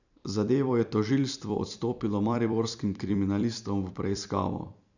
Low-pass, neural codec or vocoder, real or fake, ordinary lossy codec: 7.2 kHz; vocoder, 44.1 kHz, 128 mel bands every 256 samples, BigVGAN v2; fake; none